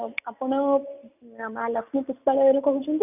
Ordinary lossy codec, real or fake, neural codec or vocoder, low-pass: none; real; none; 3.6 kHz